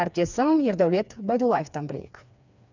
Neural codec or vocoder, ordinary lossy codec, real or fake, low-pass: codec, 16 kHz, 4 kbps, FreqCodec, smaller model; none; fake; 7.2 kHz